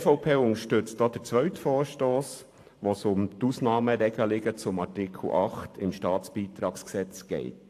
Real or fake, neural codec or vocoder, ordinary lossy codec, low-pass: fake; vocoder, 44.1 kHz, 128 mel bands every 512 samples, BigVGAN v2; AAC, 64 kbps; 14.4 kHz